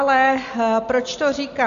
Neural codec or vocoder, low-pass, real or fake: none; 7.2 kHz; real